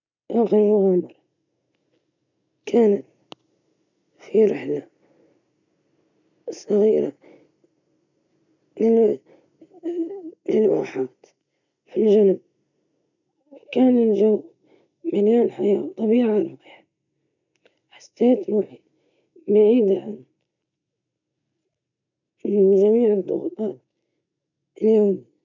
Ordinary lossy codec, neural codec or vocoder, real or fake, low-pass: none; none; real; 7.2 kHz